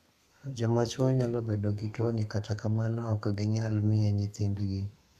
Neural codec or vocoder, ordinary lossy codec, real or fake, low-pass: codec, 32 kHz, 1.9 kbps, SNAC; none; fake; 14.4 kHz